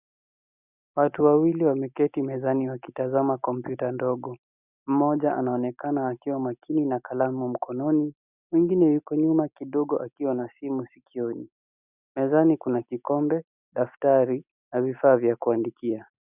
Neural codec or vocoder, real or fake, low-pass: none; real; 3.6 kHz